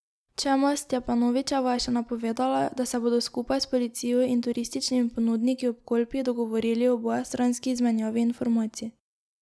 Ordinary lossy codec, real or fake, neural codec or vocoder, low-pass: none; real; none; none